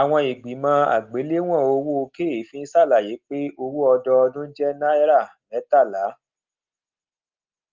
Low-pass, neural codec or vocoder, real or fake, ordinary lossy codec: 7.2 kHz; none; real; Opus, 24 kbps